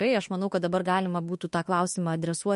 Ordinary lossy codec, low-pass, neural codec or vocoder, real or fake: MP3, 48 kbps; 14.4 kHz; autoencoder, 48 kHz, 32 numbers a frame, DAC-VAE, trained on Japanese speech; fake